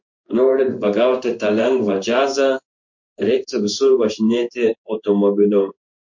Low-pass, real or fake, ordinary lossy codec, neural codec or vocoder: 7.2 kHz; fake; MP3, 48 kbps; codec, 16 kHz in and 24 kHz out, 1 kbps, XY-Tokenizer